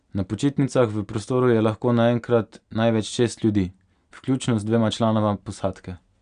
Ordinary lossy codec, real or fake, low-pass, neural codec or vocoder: none; real; 9.9 kHz; none